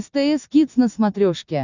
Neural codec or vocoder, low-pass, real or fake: none; 7.2 kHz; real